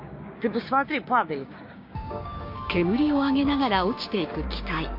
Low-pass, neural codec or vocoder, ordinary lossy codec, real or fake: 5.4 kHz; codec, 16 kHz, 6 kbps, DAC; MP3, 32 kbps; fake